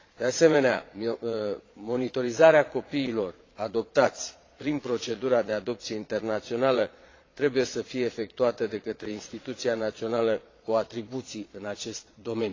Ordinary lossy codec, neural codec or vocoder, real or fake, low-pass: AAC, 32 kbps; vocoder, 22.05 kHz, 80 mel bands, Vocos; fake; 7.2 kHz